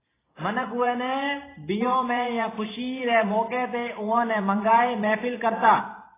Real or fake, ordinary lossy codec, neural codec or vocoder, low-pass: fake; AAC, 16 kbps; vocoder, 44.1 kHz, 128 mel bands every 512 samples, BigVGAN v2; 3.6 kHz